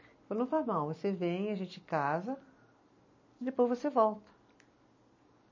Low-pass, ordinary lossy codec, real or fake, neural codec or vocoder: 7.2 kHz; MP3, 32 kbps; real; none